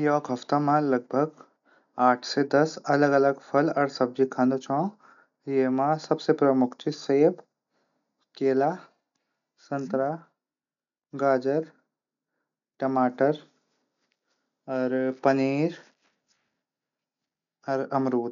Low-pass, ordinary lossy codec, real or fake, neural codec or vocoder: 7.2 kHz; none; real; none